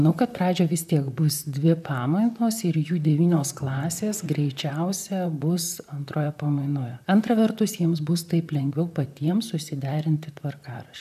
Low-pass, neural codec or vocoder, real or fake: 14.4 kHz; vocoder, 44.1 kHz, 128 mel bands, Pupu-Vocoder; fake